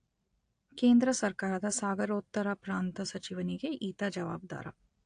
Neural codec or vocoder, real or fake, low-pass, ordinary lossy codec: none; real; 9.9 kHz; AAC, 48 kbps